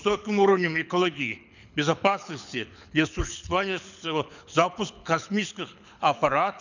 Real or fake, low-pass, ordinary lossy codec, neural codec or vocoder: fake; 7.2 kHz; none; codec, 24 kHz, 6 kbps, HILCodec